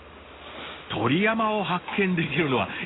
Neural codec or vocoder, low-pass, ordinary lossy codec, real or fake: none; 7.2 kHz; AAC, 16 kbps; real